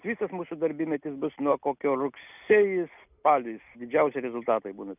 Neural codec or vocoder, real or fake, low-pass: none; real; 3.6 kHz